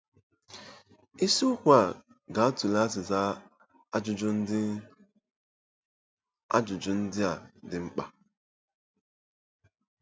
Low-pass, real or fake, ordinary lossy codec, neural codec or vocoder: none; real; none; none